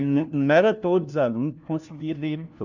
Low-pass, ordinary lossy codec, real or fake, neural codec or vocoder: 7.2 kHz; none; fake; codec, 16 kHz, 1 kbps, FunCodec, trained on LibriTTS, 50 frames a second